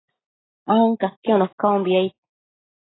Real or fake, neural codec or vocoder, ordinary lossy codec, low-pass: real; none; AAC, 16 kbps; 7.2 kHz